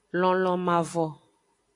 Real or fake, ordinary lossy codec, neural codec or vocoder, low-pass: real; AAC, 48 kbps; none; 10.8 kHz